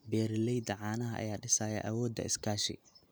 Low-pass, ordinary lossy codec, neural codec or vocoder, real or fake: none; none; none; real